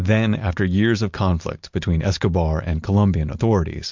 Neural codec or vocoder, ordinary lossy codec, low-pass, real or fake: none; MP3, 64 kbps; 7.2 kHz; real